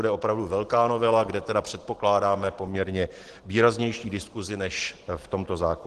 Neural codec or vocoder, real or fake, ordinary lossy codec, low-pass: none; real; Opus, 16 kbps; 10.8 kHz